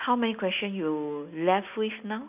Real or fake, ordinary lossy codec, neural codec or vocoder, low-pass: real; none; none; 3.6 kHz